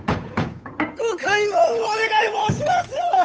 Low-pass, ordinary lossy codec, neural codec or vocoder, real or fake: none; none; codec, 16 kHz, 8 kbps, FunCodec, trained on Chinese and English, 25 frames a second; fake